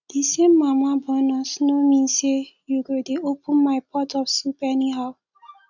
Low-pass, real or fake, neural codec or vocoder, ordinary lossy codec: 7.2 kHz; real; none; none